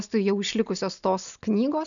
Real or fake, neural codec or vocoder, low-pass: real; none; 7.2 kHz